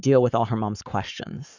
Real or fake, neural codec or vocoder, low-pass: fake; codec, 44.1 kHz, 7.8 kbps, Pupu-Codec; 7.2 kHz